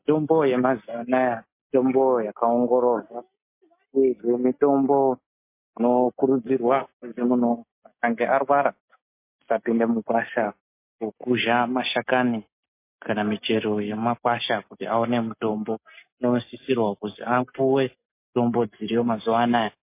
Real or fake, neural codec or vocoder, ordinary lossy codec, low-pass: real; none; MP3, 24 kbps; 3.6 kHz